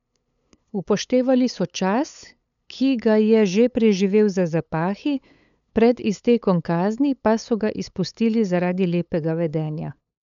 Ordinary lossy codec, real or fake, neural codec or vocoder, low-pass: none; fake; codec, 16 kHz, 8 kbps, FunCodec, trained on LibriTTS, 25 frames a second; 7.2 kHz